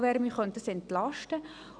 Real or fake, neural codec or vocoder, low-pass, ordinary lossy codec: real; none; 9.9 kHz; none